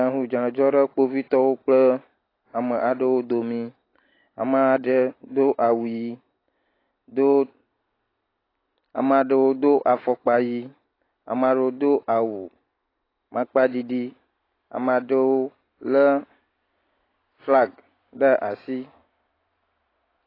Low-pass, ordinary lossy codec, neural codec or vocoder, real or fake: 5.4 kHz; AAC, 24 kbps; none; real